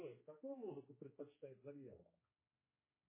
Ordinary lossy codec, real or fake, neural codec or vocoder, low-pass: MP3, 16 kbps; fake; codec, 16 kHz, 2 kbps, X-Codec, HuBERT features, trained on general audio; 3.6 kHz